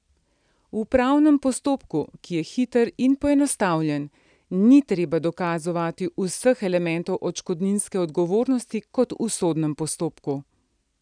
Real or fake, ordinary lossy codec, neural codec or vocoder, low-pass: real; none; none; 9.9 kHz